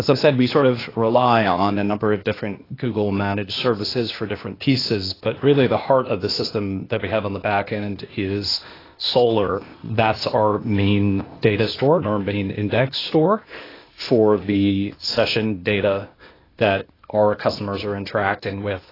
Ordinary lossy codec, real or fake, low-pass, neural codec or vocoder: AAC, 24 kbps; fake; 5.4 kHz; codec, 16 kHz, 0.8 kbps, ZipCodec